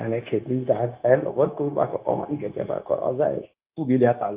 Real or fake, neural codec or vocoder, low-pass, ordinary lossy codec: fake; codec, 16 kHz, 0.9 kbps, LongCat-Audio-Codec; 3.6 kHz; Opus, 24 kbps